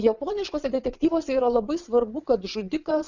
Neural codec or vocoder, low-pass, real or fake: vocoder, 22.05 kHz, 80 mel bands, WaveNeXt; 7.2 kHz; fake